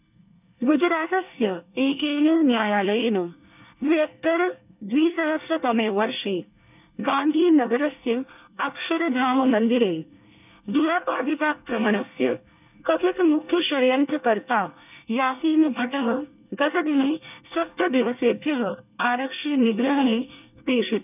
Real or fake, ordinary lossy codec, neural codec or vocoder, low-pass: fake; none; codec, 24 kHz, 1 kbps, SNAC; 3.6 kHz